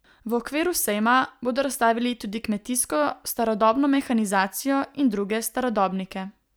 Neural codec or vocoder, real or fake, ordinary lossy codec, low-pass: none; real; none; none